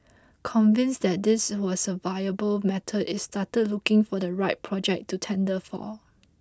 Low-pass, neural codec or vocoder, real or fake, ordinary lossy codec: none; none; real; none